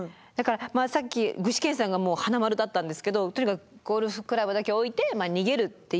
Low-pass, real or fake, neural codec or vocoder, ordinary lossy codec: none; real; none; none